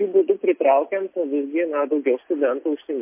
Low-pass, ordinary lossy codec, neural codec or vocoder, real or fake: 3.6 kHz; MP3, 24 kbps; none; real